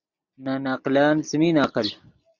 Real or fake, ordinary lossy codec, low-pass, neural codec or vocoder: real; MP3, 64 kbps; 7.2 kHz; none